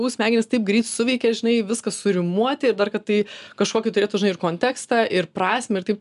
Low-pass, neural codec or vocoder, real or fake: 10.8 kHz; none; real